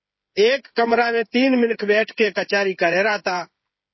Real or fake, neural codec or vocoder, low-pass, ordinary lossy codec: fake; codec, 16 kHz, 8 kbps, FreqCodec, smaller model; 7.2 kHz; MP3, 24 kbps